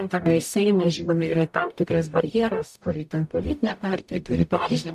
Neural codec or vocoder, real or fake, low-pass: codec, 44.1 kHz, 0.9 kbps, DAC; fake; 14.4 kHz